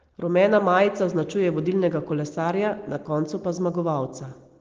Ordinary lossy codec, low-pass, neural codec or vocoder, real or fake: Opus, 16 kbps; 7.2 kHz; none; real